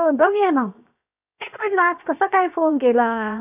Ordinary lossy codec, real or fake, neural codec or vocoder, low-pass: none; fake; codec, 16 kHz, 0.7 kbps, FocalCodec; 3.6 kHz